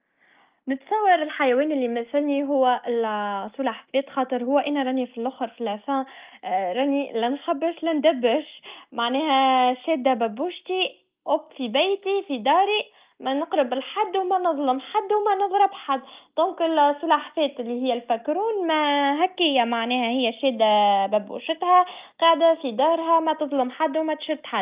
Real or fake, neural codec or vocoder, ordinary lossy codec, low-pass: real; none; Opus, 24 kbps; 3.6 kHz